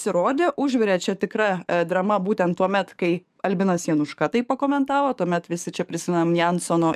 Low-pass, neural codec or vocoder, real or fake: 14.4 kHz; codec, 44.1 kHz, 7.8 kbps, DAC; fake